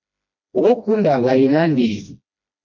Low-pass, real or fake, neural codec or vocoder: 7.2 kHz; fake; codec, 16 kHz, 1 kbps, FreqCodec, smaller model